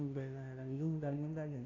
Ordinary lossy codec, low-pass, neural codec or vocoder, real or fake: AAC, 32 kbps; 7.2 kHz; codec, 16 kHz, 0.5 kbps, FunCodec, trained on Chinese and English, 25 frames a second; fake